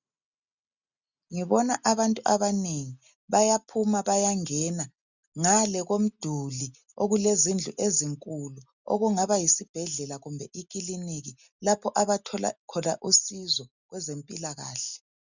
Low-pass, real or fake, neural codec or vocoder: 7.2 kHz; real; none